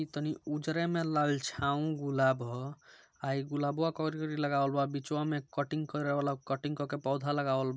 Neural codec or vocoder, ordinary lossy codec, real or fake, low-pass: none; none; real; none